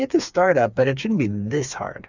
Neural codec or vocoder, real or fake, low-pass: codec, 16 kHz, 4 kbps, FreqCodec, smaller model; fake; 7.2 kHz